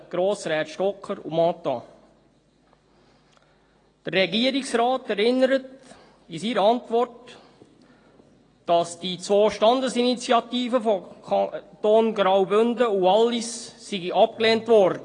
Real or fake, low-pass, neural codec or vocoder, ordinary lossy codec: real; 9.9 kHz; none; AAC, 32 kbps